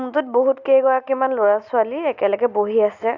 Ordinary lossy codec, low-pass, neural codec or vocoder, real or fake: none; 7.2 kHz; none; real